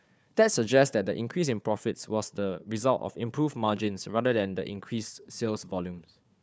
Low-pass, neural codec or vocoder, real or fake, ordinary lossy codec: none; codec, 16 kHz, 4 kbps, FunCodec, trained on Chinese and English, 50 frames a second; fake; none